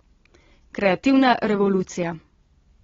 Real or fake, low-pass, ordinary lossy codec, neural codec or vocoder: real; 7.2 kHz; AAC, 24 kbps; none